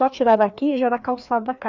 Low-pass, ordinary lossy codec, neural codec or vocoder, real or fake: 7.2 kHz; none; codec, 16 kHz, 2 kbps, FreqCodec, larger model; fake